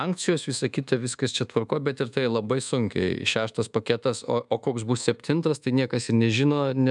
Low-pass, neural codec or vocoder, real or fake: 10.8 kHz; codec, 24 kHz, 1.2 kbps, DualCodec; fake